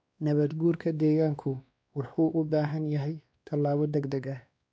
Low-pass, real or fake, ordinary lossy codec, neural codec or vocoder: none; fake; none; codec, 16 kHz, 2 kbps, X-Codec, WavLM features, trained on Multilingual LibriSpeech